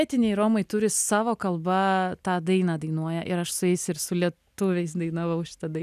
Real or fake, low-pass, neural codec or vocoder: real; 14.4 kHz; none